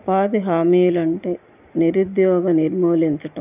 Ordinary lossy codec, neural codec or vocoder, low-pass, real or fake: none; none; 3.6 kHz; real